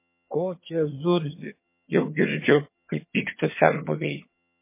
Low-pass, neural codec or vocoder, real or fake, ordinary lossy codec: 3.6 kHz; vocoder, 22.05 kHz, 80 mel bands, HiFi-GAN; fake; MP3, 24 kbps